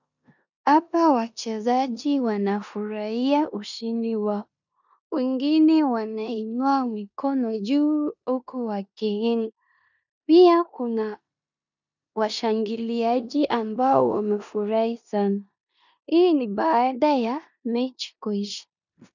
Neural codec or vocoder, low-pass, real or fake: codec, 16 kHz in and 24 kHz out, 0.9 kbps, LongCat-Audio-Codec, four codebook decoder; 7.2 kHz; fake